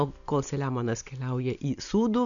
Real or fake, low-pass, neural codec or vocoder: real; 7.2 kHz; none